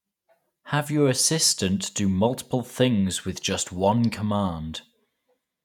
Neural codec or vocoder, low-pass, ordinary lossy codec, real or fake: vocoder, 48 kHz, 128 mel bands, Vocos; 19.8 kHz; none; fake